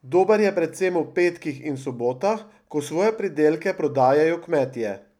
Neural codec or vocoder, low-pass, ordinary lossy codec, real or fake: none; 19.8 kHz; none; real